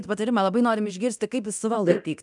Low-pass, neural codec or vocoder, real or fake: 10.8 kHz; codec, 24 kHz, 0.9 kbps, DualCodec; fake